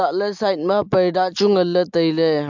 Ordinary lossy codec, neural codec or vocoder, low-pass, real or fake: MP3, 64 kbps; none; 7.2 kHz; real